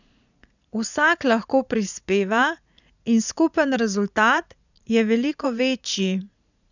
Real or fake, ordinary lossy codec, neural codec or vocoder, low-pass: fake; none; vocoder, 44.1 kHz, 80 mel bands, Vocos; 7.2 kHz